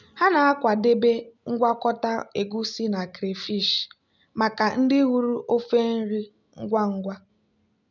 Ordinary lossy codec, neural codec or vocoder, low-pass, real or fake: none; none; 7.2 kHz; real